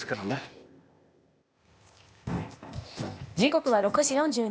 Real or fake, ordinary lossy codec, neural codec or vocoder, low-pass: fake; none; codec, 16 kHz, 0.8 kbps, ZipCodec; none